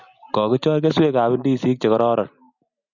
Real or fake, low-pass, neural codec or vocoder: real; 7.2 kHz; none